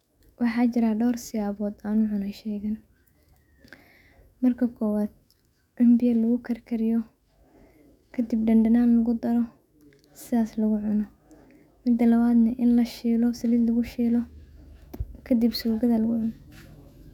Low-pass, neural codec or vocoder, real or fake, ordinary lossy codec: 19.8 kHz; autoencoder, 48 kHz, 128 numbers a frame, DAC-VAE, trained on Japanese speech; fake; none